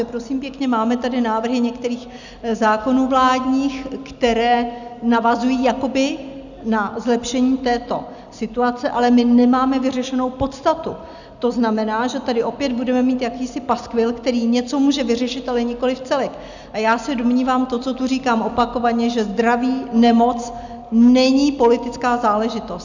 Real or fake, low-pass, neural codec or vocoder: real; 7.2 kHz; none